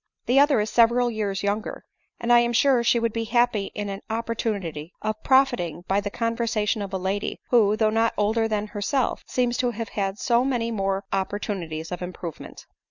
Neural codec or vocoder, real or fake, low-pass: none; real; 7.2 kHz